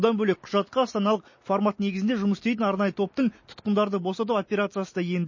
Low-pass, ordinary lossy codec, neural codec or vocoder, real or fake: 7.2 kHz; MP3, 32 kbps; none; real